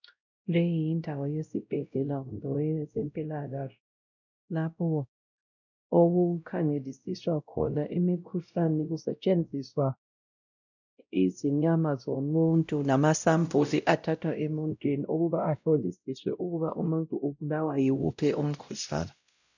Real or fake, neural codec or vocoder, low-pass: fake; codec, 16 kHz, 0.5 kbps, X-Codec, WavLM features, trained on Multilingual LibriSpeech; 7.2 kHz